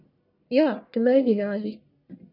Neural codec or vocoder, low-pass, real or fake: codec, 44.1 kHz, 1.7 kbps, Pupu-Codec; 5.4 kHz; fake